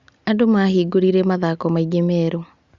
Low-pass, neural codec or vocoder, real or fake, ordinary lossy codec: 7.2 kHz; none; real; Opus, 64 kbps